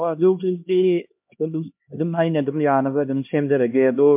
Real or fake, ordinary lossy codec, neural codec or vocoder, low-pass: fake; MP3, 32 kbps; codec, 16 kHz, 1 kbps, X-Codec, HuBERT features, trained on LibriSpeech; 3.6 kHz